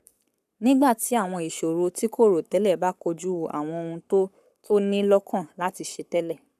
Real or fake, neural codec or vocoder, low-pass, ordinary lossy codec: fake; codec, 44.1 kHz, 7.8 kbps, Pupu-Codec; 14.4 kHz; none